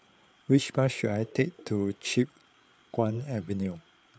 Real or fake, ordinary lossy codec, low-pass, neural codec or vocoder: fake; none; none; codec, 16 kHz, 8 kbps, FreqCodec, larger model